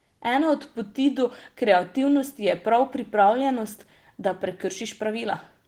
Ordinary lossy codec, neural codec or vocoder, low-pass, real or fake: Opus, 16 kbps; none; 19.8 kHz; real